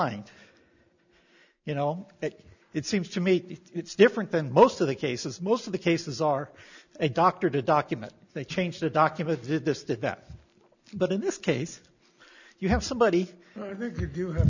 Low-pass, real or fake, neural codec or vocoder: 7.2 kHz; real; none